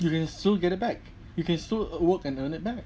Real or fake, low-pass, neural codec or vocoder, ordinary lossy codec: real; none; none; none